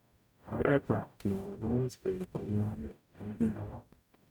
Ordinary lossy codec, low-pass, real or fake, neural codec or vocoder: none; 19.8 kHz; fake; codec, 44.1 kHz, 0.9 kbps, DAC